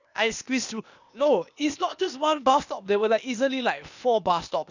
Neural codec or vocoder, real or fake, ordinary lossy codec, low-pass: codec, 16 kHz, 0.8 kbps, ZipCodec; fake; none; 7.2 kHz